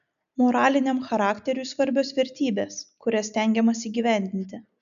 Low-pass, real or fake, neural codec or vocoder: 7.2 kHz; real; none